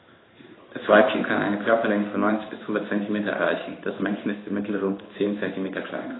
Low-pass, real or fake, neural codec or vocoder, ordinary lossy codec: 7.2 kHz; fake; codec, 16 kHz in and 24 kHz out, 1 kbps, XY-Tokenizer; AAC, 16 kbps